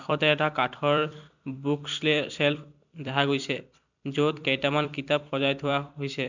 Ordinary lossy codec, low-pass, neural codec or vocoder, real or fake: none; 7.2 kHz; vocoder, 44.1 kHz, 128 mel bands every 256 samples, BigVGAN v2; fake